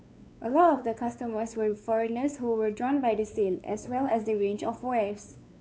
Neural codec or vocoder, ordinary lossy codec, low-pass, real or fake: codec, 16 kHz, 4 kbps, X-Codec, WavLM features, trained on Multilingual LibriSpeech; none; none; fake